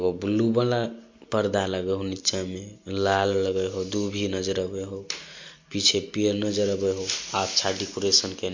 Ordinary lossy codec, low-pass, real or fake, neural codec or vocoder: MP3, 48 kbps; 7.2 kHz; real; none